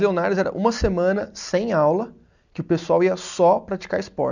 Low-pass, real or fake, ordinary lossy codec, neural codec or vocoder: 7.2 kHz; real; none; none